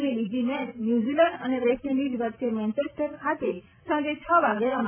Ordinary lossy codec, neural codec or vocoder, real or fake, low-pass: MP3, 24 kbps; none; real; 3.6 kHz